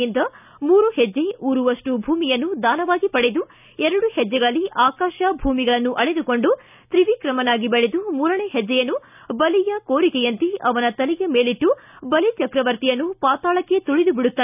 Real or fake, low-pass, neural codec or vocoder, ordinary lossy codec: real; 3.6 kHz; none; none